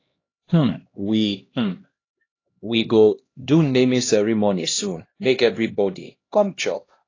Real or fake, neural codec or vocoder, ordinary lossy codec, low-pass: fake; codec, 16 kHz, 1 kbps, X-Codec, HuBERT features, trained on LibriSpeech; AAC, 32 kbps; 7.2 kHz